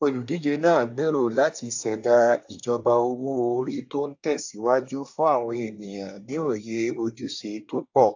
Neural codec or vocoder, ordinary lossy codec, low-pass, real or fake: codec, 24 kHz, 1 kbps, SNAC; AAC, 48 kbps; 7.2 kHz; fake